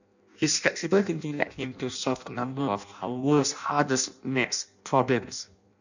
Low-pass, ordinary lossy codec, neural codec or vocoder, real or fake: 7.2 kHz; none; codec, 16 kHz in and 24 kHz out, 0.6 kbps, FireRedTTS-2 codec; fake